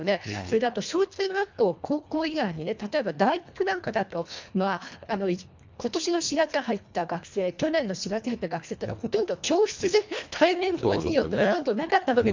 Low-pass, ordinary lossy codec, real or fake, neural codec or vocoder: 7.2 kHz; MP3, 64 kbps; fake; codec, 24 kHz, 1.5 kbps, HILCodec